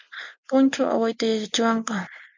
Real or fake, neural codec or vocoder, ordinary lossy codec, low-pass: real; none; MP3, 64 kbps; 7.2 kHz